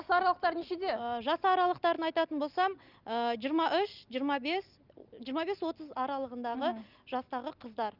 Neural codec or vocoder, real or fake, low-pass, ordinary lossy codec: none; real; 5.4 kHz; Opus, 24 kbps